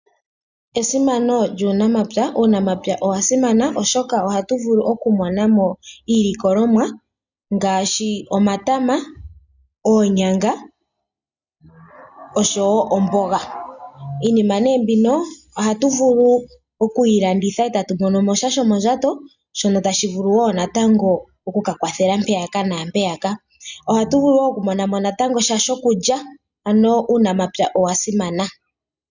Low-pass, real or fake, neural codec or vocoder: 7.2 kHz; real; none